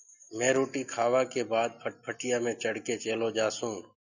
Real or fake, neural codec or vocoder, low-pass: real; none; 7.2 kHz